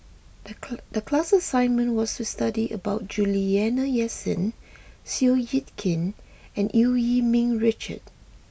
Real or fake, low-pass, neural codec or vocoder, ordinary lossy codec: real; none; none; none